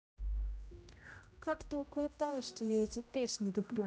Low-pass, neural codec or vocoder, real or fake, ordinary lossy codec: none; codec, 16 kHz, 0.5 kbps, X-Codec, HuBERT features, trained on general audio; fake; none